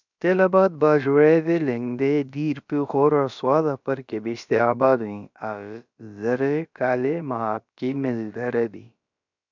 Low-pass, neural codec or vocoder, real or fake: 7.2 kHz; codec, 16 kHz, about 1 kbps, DyCAST, with the encoder's durations; fake